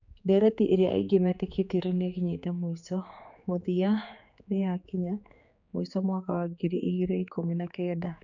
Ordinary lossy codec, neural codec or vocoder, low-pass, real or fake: none; codec, 16 kHz, 4 kbps, X-Codec, HuBERT features, trained on general audio; 7.2 kHz; fake